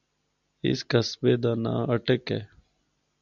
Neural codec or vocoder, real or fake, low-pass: none; real; 7.2 kHz